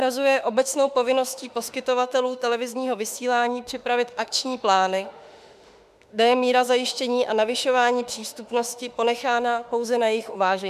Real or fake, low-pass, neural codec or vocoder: fake; 14.4 kHz; autoencoder, 48 kHz, 32 numbers a frame, DAC-VAE, trained on Japanese speech